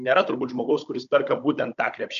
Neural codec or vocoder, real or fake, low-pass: codec, 16 kHz, 16 kbps, FunCodec, trained on Chinese and English, 50 frames a second; fake; 7.2 kHz